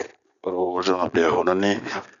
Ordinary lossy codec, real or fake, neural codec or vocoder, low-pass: none; real; none; 7.2 kHz